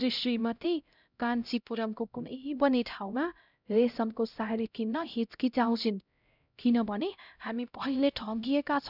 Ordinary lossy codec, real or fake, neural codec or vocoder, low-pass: none; fake; codec, 16 kHz, 0.5 kbps, X-Codec, HuBERT features, trained on LibriSpeech; 5.4 kHz